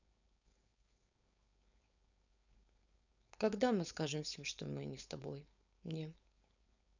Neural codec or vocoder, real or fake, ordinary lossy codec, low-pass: codec, 16 kHz, 4.8 kbps, FACodec; fake; none; 7.2 kHz